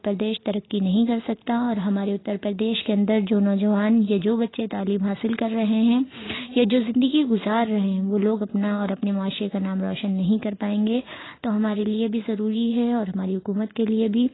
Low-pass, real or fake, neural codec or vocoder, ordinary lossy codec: 7.2 kHz; real; none; AAC, 16 kbps